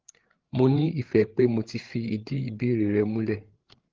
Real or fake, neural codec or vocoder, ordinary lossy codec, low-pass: fake; codec, 16 kHz, 8 kbps, FreqCodec, larger model; Opus, 16 kbps; 7.2 kHz